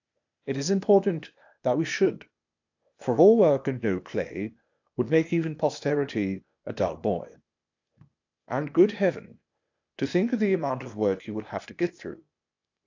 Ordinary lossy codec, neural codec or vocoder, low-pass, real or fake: AAC, 48 kbps; codec, 16 kHz, 0.8 kbps, ZipCodec; 7.2 kHz; fake